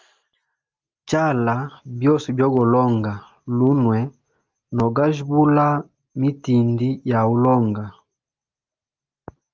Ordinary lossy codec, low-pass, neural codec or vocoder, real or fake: Opus, 24 kbps; 7.2 kHz; none; real